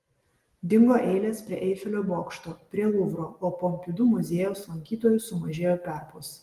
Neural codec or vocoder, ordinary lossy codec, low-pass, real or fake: none; Opus, 24 kbps; 14.4 kHz; real